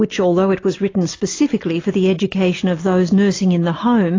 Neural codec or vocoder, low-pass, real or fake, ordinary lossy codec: none; 7.2 kHz; real; AAC, 32 kbps